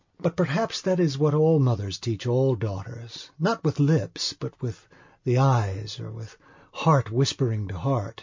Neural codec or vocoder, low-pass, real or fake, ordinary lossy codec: none; 7.2 kHz; real; MP3, 48 kbps